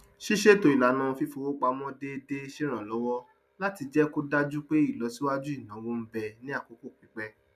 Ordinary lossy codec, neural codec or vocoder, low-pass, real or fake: none; none; 14.4 kHz; real